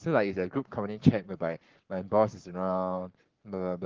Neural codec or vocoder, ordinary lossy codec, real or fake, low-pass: codec, 44.1 kHz, 7.8 kbps, Pupu-Codec; Opus, 16 kbps; fake; 7.2 kHz